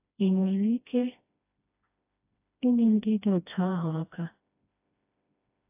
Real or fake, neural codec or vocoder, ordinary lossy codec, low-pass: fake; codec, 16 kHz, 1 kbps, FreqCodec, smaller model; AAC, 32 kbps; 3.6 kHz